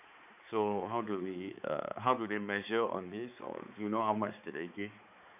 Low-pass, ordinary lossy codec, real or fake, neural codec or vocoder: 3.6 kHz; none; fake; codec, 16 kHz, 4 kbps, X-Codec, HuBERT features, trained on balanced general audio